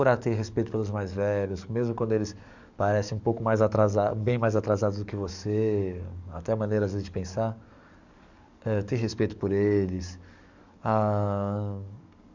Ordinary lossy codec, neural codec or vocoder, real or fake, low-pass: none; codec, 44.1 kHz, 7.8 kbps, DAC; fake; 7.2 kHz